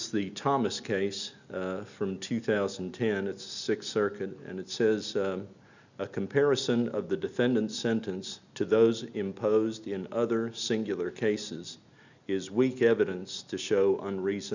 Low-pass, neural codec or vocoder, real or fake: 7.2 kHz; none; real